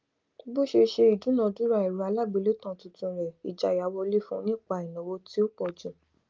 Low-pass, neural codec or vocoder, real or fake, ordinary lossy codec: 7.2 kHz; none; real; Opus, 24 kbps